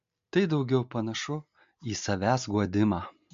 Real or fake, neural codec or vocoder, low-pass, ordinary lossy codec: real; none; 7.2 kHz; MP3, 48 kbps